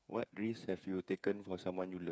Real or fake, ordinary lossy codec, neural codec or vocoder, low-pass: fake; none; codec, 16 kHz, 8 kbps, FreqCodec, smaller model; none